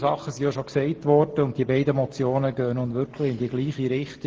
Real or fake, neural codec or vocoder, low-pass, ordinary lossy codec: real; none; 7.2 kHz; Opus, 16 kbps